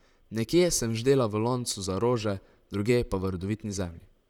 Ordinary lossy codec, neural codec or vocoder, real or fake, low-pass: none; vocoder, 44.1 kHz, 128 mel bands, Pupu-Vocoder; fake; 19.8 kHz